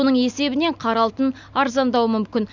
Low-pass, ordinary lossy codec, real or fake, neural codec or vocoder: 7.2 kHz; none; real; none